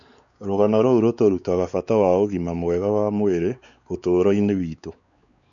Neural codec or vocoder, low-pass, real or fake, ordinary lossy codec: codec, 16 kHz, 4 kbps, X-Codec, WavLM features, trained on Multilingual LibriSpeech; 7.2 kHz; fake; none